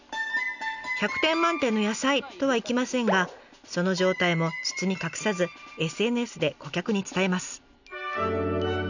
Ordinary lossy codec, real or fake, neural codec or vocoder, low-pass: none; real; none; 7.2 kHz